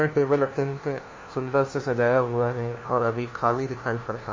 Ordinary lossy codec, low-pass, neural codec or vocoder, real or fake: MP3, 32 kbps; 7.2 kHz; codec, 16 kHz, 1 kbps, FunCodec, trained on LibriTTS, 50 frames a second; fake